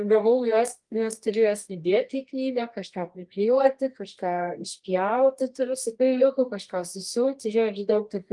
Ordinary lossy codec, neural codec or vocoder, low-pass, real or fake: Opus, 24 kbps; codec, 24 kHz, 0.9 kbps, WavTokenizer, medium music audio release; 10.8 kHz; fake